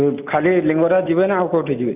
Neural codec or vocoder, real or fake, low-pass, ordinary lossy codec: none; real; 3.6 kHz; AAC, 32 kbps